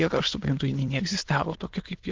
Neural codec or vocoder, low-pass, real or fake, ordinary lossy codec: autoencoder, 22.05 kHz, a latent of 192 numbers a frame, VITS, trained on many speakers; 7.2 kHz; fake; Opus, 16 kbps